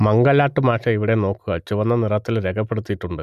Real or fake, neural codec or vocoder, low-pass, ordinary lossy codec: real; none; 14.4 kHz; none